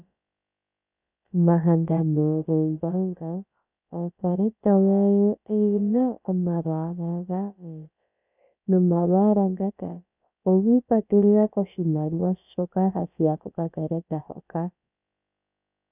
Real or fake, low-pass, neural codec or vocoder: fake; 3.6 kHz; codec, 16 kHz, about 1 kbps, DyCAST, with the encoder's durations